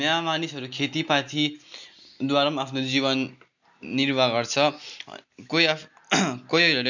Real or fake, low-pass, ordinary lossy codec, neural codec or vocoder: real; 7.2 kHz; none; none